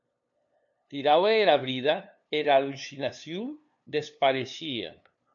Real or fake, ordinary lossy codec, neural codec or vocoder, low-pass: fake; MP3, 64 kbps; codec, 16 kHz, 2 kbps, FunCodec, trained on LibriTTS, 25 frames a second; 7.2 kHz